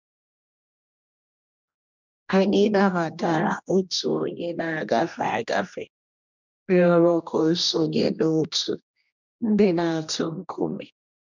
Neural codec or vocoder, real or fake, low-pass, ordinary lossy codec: codec, 16 kHz, 1 kbps, X-Codec, HuBERT features, trained on general audio; fake; 7.2 kHz; none